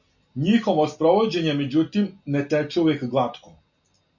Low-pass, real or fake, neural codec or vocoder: 7.2 kHz; real; none